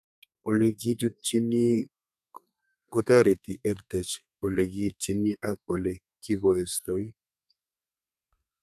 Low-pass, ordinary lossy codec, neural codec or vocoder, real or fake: 14.4 kHz; none; codec, 32 kHz, 1.9 kbps, SNAC; fake